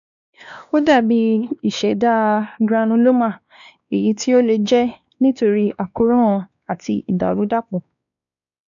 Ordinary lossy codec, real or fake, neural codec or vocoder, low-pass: none; fake; codec, 16 kHz, 2 kbps, X-Codec, WavLM features, trained on Multilingual LibriSpeech; 7.2 kHz